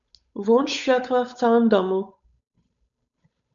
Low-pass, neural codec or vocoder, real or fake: 7.2 kHz; codec, 16 kHz, 8 kbps, FunCodec, trained on Chinese and English, 25 frames a second; fake